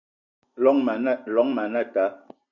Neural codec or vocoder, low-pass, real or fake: none; 7.2 kHz; real